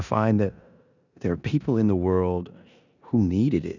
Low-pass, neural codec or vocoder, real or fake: 7.2 kHz; codec, 16 kHz in and 24 kHz out, 0.9 kbps, LongCat-Audio-Codec, four codebook decoder; fake